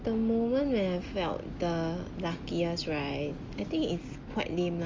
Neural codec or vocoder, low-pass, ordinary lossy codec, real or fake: none; 7.2 kHz; Opus, 32 kbps; real